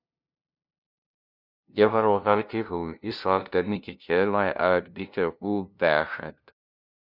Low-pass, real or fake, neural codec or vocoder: 5.4 kHz; fake; codec, 16 kHz, 0.5 kbps, FunCodec, trained on LibriTTS, 25 frames a second